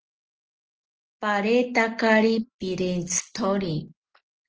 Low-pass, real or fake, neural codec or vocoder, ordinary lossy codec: 7.2 kHz; real; none; Opus, 16 kbps